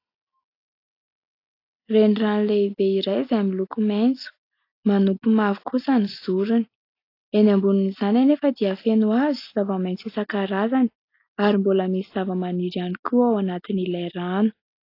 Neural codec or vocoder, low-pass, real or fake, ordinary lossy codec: none; 5.4 kHz; real; AAC, 32 kbps